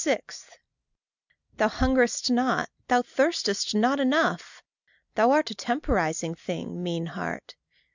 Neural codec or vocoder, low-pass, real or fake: none; 7.2 kHz; real